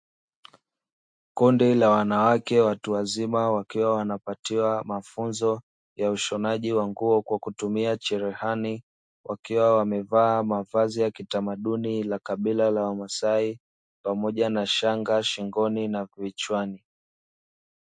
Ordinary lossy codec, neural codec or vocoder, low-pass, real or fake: MP3, 48 kbps; none; 9.9 kHz; real